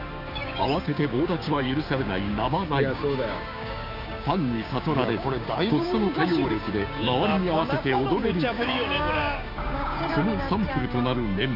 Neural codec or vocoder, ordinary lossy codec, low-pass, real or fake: codec, 16 kHz, 6 kbps, DAC; none; 5.4 kHz; fake